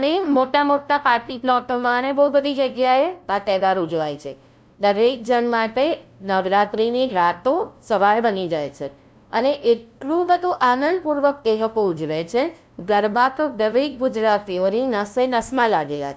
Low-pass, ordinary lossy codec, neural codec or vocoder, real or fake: none; none; codec, 16 kHz, 0.5 kbps, FunCodec, trained on LibriTTS, 25 frames a second; fake